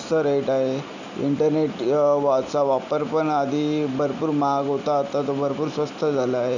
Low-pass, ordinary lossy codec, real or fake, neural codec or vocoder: 7.2 kHz; none; real; none